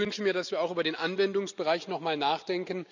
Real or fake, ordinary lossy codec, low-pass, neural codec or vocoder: real; none; 7.2 kHz; none